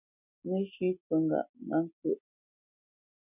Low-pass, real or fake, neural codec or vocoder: 3.6 kHz; fake; vocoder, 44.1 kHz, 128 mel bands every 256 samples, BigVGAN v2